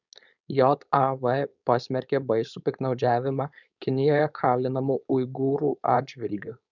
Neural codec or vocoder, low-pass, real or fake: codec, 16 kHz, 4.8 kbps, FACodec; 7.2 kHz; fake